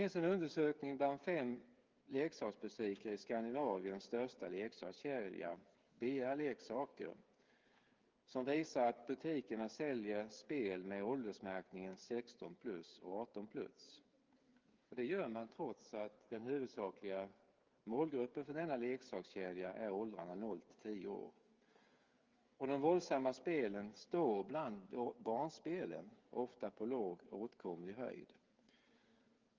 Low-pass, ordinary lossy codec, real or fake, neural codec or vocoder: 7.2 kHz; Opus, 32 kbps; fake; codec, 16 kHz, 8 kbps, FreqCodec, smaller model